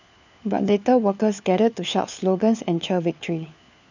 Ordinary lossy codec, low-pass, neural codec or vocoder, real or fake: none; 7.2 kHz; codec, 16 kHz, 4 kbps, FunCodec, trained on LibriTTS, 50 frames a second; fake